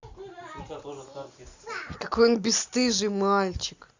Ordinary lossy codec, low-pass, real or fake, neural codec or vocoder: Opus, 64 kbps; 7.2 kHz; real; none